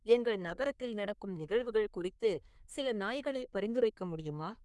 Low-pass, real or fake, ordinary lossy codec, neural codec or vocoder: none; fake; none; codec, 24 kHz, 1 kbps, SNAC